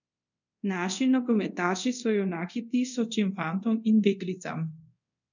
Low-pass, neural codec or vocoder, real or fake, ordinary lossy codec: 7.2 kHz; codec, 24 kHz, 0.5 kbps, DualCodec; fake; none